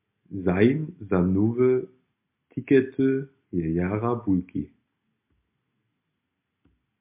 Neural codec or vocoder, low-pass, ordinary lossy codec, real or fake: none; 3.6 kHz; MP3, 32 kbps; real